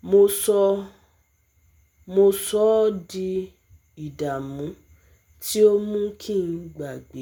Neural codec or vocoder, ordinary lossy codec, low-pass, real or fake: none; none; none; real